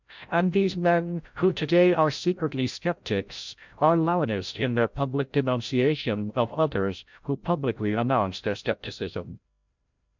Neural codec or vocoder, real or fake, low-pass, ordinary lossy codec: codec, 16 kHz, 0.5 kbps, FreqCodec, larger model; fake; 7.2 kHz; MP3, 64 kbps